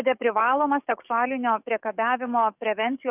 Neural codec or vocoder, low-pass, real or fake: codec, 44.1 kHz, 7.8 kbps, DAC; 3.6 kHz; fake